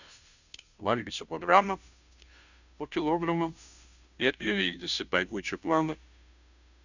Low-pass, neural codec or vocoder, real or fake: 7.2 kHz; codec, 16 kHz, 0.5 kbps, FunCodec, trained on Chinese and English, 25 frames a second; fake